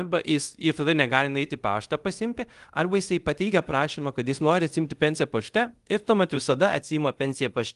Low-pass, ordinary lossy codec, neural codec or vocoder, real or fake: 10.8 kHz; Opus, 24 kbps; codec, 24 kHz, 0.5 kbps, DualCodec; fake